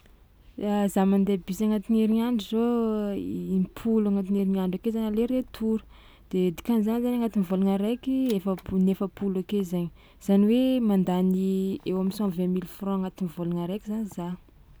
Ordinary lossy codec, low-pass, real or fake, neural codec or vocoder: none; none; real; none